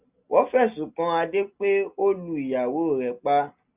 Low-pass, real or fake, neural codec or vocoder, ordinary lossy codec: 3.6 kHz; real; none; Opus, 64 kbps